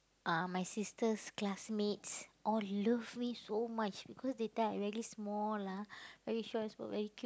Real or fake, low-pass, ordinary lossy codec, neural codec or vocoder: real; none; none; none